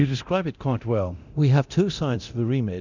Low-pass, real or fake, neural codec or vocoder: 7.2 kHz; fake; codec, 24 kHz, 0.9 kbps, DualCodec